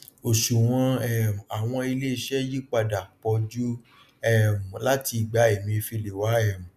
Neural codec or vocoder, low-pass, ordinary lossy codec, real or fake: none; 14.4 kHz; none; real